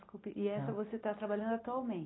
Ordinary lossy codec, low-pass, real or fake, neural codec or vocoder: AAC, 16 kbps; 7.2 kHz; real; none